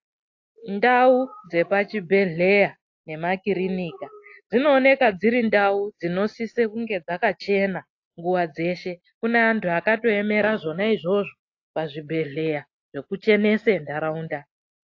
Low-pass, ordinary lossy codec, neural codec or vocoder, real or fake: 7.2 kHz; AAC, 48 kbps; none; real